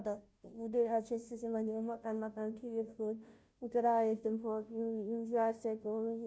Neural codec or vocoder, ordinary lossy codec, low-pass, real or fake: codec, 16 kHz, 0.5 kbps, FunCodec, trained on Chinese and English, 25 frames a second; none; none; fake